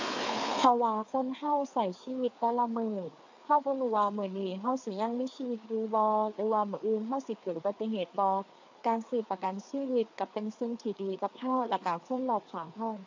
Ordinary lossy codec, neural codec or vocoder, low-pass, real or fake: AAC, 48 kbps; codec, 16 kHz, 4 kbps, FreqCodec, larger model; 7.2 kHz; fake